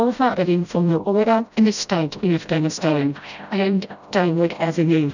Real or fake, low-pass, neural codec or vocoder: fake; 7.2 kHz; codec, 16 kHz, 0.5 kbps, FreqCodec, smaller model